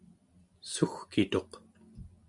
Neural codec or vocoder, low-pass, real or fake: none; 10.8 kHz; real